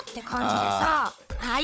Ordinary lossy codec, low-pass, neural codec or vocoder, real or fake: none; none; codec, 16 kHz, 16 kbps, FunCodec, trained on Chinese and English, 50 frames a second; fake